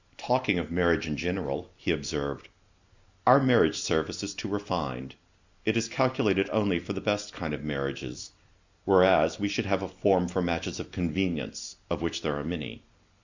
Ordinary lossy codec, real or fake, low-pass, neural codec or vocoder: Opus, 64 kbps; real; 7.2 kHz; none